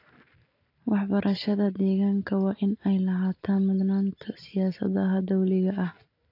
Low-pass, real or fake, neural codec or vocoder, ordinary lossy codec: 5.4 kHz; real; none; AAC, 32 kbps